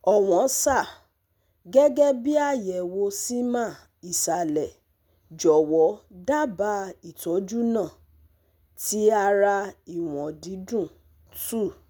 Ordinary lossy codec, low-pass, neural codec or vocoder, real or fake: none; none; none; real